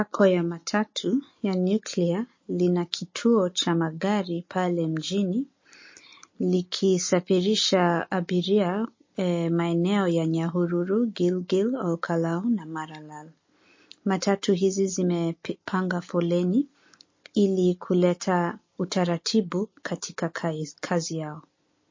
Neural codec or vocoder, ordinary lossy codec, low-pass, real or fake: none; MP3, 32 kbps; 7.2 kHz; real